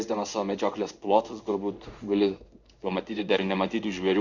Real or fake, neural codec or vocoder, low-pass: fake; codec, 16 kHz in and 24 kHz out, 1 kbps, XY-Tokenizer; 7.2 kHz